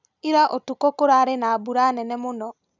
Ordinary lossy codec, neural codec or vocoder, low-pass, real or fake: none; none; 7.2 kHz; real